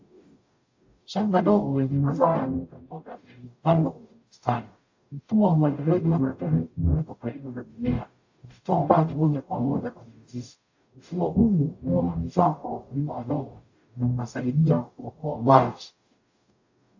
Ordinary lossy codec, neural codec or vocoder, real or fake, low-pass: AAC, 48 kbps; codec, 44.1 kHz, 0.9 kbps, DAC; fake; 7.2 kHz